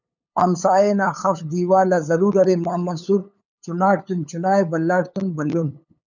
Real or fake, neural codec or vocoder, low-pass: fake; codec, 16 kHz, 8 kbps, FunCodec, trained on LibriTTS, 25 frames a second; 7.2 kHz